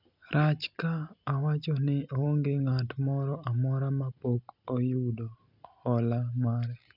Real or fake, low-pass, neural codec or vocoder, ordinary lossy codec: real; 5.4 kHz; none; none